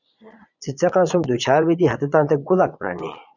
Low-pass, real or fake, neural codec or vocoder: 7.2 kHz; fake; vocoder, 22.05 kHz, 80 mel bands, Vocos